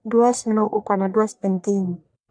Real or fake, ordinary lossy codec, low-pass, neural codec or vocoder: fake; none; 9.9 kHz; codec, 44.1 kHz, 1.7 kbps, Pupu-Codec